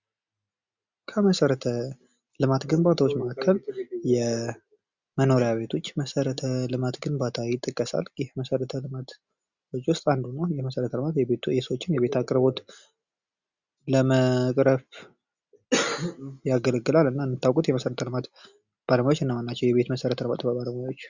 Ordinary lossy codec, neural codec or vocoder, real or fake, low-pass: Opus, 64 kbps; none; real; 7.2 kHz